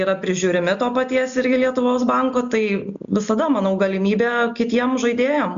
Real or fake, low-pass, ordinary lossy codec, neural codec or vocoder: real; 7.2 kHz; MP3, 64 kbps; none